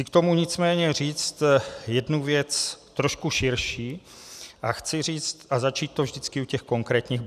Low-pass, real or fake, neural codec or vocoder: 14.4 kHz; real; none